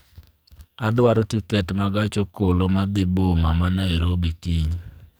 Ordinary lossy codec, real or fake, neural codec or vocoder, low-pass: none; fake; codec, 44.1 kHz, 2.6 kbps, SNAC; none